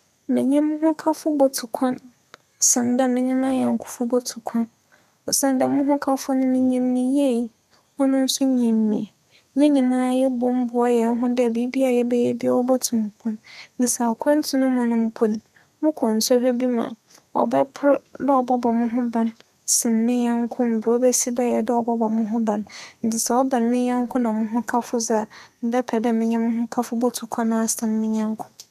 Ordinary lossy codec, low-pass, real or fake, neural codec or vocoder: none; 14.4 kHz; fake; codec, 32 kHz, 1.9 kbps, SNAC